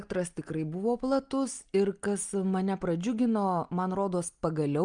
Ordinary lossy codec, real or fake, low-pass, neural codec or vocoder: Opus, 32 kbps; real; 9.9 kHz; none